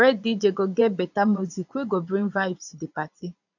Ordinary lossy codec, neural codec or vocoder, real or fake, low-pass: none; vocoder, 22.05 kHz, 80 mel bands, Vocos; fake; 7.2 kHz